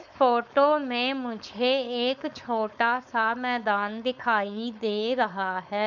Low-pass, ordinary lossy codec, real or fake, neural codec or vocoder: 7.2 kHz; none; fake; codec, 16 kHz, 4.8 kbps, FACodec